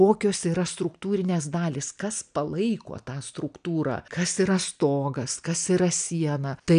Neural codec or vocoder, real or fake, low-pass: none; real; 9.9 kHz